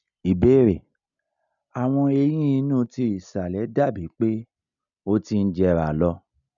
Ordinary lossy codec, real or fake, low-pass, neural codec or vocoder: none; real; 7.2 kHz; none